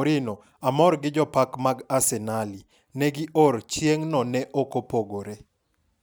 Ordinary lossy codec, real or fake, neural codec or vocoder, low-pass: none; real; none; none